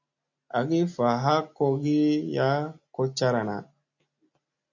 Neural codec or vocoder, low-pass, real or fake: none; 7.2 kHz; real